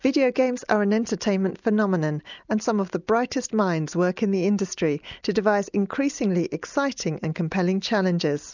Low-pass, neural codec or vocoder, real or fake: 7.2 kHz; none; real